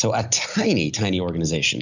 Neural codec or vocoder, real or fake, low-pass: none; real; 7.2 kHz